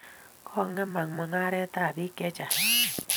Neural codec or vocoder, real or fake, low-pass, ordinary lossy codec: vocoder, 44.1 kHz, 128 mel bands every 256 samples, BigVGAN v2; fake; none; none